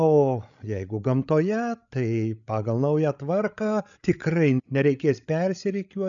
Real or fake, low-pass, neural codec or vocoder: fake; 7.2 kHz; codec, 16 kHz, 8 kbps, FreqCodec, larger model